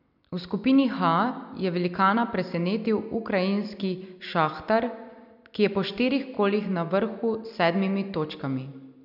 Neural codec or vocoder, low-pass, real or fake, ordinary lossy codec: none; 5.4 kHz; real; none